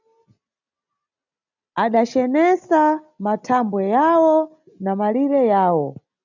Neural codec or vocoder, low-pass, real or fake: none; 7.2 kHz; real